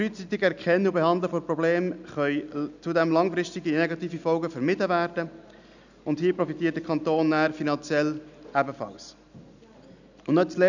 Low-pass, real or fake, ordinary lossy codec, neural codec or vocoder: 7.2 kHz; real; none; none